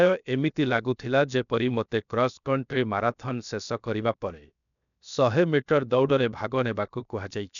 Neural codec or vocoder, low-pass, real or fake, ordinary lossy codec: codec, 16 kHz, 0.7 kbps, FocalCodec; 7.2 kHz; fake; none